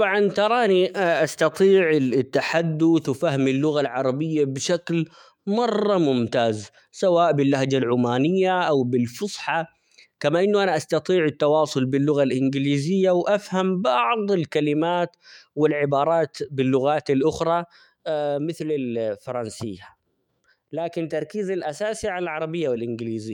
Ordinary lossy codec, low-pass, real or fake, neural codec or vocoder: MP3, 96 kbps; 19.8 kHz; fake; autoencoder, 48 kHz, 128 numbers a frame, DAC-VAE, trained on Japanese speech